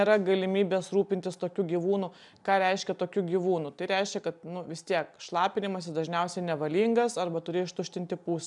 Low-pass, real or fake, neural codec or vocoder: 10.8 kHz; real; none